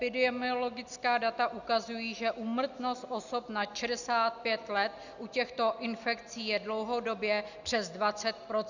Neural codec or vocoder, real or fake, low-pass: none; real; 7.2 kHz